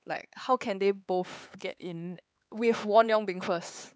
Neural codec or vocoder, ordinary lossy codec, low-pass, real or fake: codec, 16 kHz, 4 kbps, X-Codec, HuBERT features, trained on LibriSpeech; none; none; fake